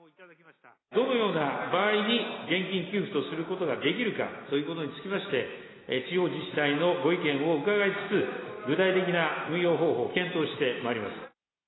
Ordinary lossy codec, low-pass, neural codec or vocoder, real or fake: AAC, 16 kbps; 7.2 kHz; none; real